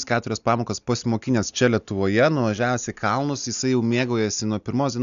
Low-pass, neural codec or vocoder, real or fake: 7.2 kHz; none; real